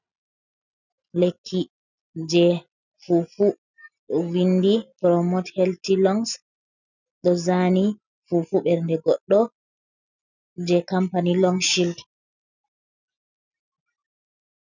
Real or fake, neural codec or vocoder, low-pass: real; none; 7.2 kHz